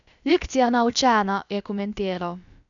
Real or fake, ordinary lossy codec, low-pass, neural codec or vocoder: fake; none; 7.2 kHz; codec, 16 kHz, about 1 kbps, DyCAST, with the encoder's durations